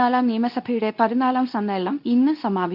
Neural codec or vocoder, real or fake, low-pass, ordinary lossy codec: codec, 24 kHz, 0.9 kbps, WavTokenizer, medium speech release version 2; fake; 5.4 kHz; none